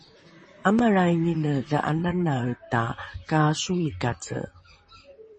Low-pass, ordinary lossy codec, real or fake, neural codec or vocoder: 10.8 kHz; MP3, 32 kbps; fake; vocoder, 44.1 kHz, 128 mel bands, Pupu-Vocoder